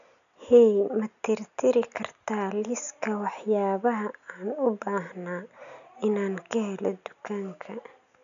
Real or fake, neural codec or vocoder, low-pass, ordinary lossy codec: real; none; 7.2 kHz; none